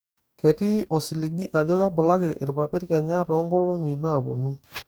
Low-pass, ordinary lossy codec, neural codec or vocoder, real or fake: none; none; codec, 44.1 kHz, 2.6 kbps, DAC; fake